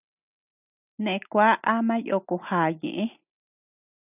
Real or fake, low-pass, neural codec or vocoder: real; 3.6 kHz; none